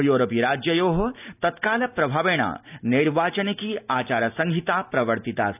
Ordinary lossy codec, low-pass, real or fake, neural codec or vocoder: none; 3.6 kHz; real; none